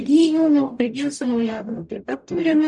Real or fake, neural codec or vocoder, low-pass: fake; codec, 44.1 kHz, 0.9 kbps, DAC; 10.8 kHz